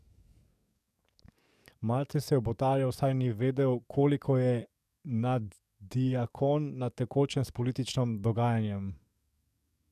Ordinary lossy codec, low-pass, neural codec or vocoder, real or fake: none; 14.4 kHz; codec, 44.1 kHz, 7.8 kbps, DAC; fake